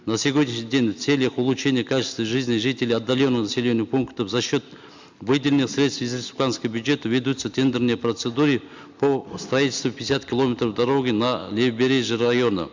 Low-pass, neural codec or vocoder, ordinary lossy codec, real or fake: 7.2 kHz; none; MP3, 64 kbps; real